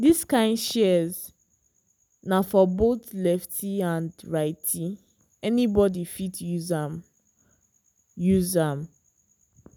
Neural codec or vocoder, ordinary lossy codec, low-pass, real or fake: none; none; none; real